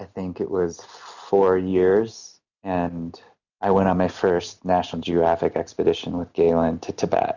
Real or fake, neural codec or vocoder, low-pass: real; none; 7.2 kHz